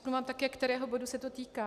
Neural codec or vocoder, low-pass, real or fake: none; 14.4 kHz; real